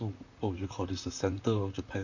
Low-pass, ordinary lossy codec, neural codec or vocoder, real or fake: 7.2 kHz; none; vocoder, 44.1 kHz, 128 mel bands, Pupu-Vocoder; fake